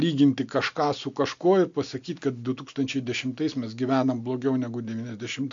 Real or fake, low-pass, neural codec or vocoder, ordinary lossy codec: real; 7.2 kHz; none; MP3, 64 kbps